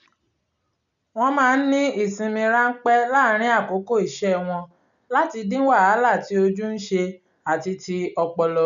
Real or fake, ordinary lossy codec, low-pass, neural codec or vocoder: real; none; 7.2 kHz; none